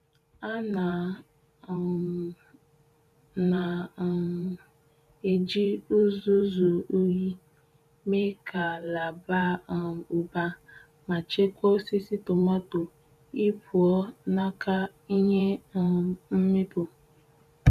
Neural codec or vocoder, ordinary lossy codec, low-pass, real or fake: vocoder, 44.1 kHz, 128 mel bands every 512 samples, BigVGAN v2; none; 14.4 kHz; fake